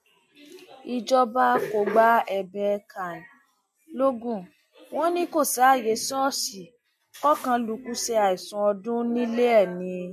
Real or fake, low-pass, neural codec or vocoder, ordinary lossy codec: real; 14.4 kHz; none; MP3, 64 kbps